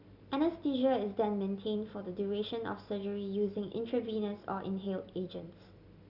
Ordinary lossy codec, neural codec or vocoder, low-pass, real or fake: none; none; 5.4 kHz; real